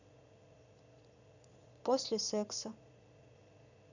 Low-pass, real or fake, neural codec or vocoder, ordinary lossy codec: 7.2 kHz; fake; vocoder, 22.05 kHz, 80 mel bands, Vocos; none